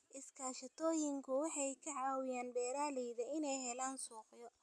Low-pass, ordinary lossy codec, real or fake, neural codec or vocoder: none; none; real; none